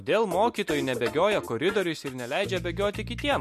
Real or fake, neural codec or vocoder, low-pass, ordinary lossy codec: real; none; 14.4 kHz; MP3, 64 kbps